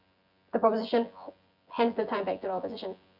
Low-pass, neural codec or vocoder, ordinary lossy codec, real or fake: 5.4 kHz; vocoder, 24 kHz, 100 mel bands, Vocos; none; fake